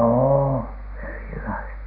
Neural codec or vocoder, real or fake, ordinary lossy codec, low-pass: none; real; none; 5.4 kHz